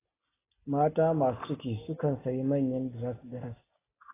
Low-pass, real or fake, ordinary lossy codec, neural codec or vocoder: 3.6 kHz; real; AAC, 16 kbps; none